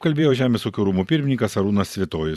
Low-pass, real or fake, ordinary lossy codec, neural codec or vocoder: 14.4 kHz; fake; Opus, 64 kbps; vocoder, 44.1 kHz, 128 mel bands every 512 samples, BigVGAN v2